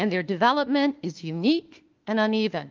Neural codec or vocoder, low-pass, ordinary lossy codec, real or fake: autoencoder, 48 kHz, 32 numbers a frame, DAC-VAE, trained on Japanese speech; 7.2 kHz; Opus, 32 kbps; fake